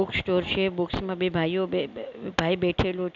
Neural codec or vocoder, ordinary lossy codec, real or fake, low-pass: none; none; real; 7.2 kHz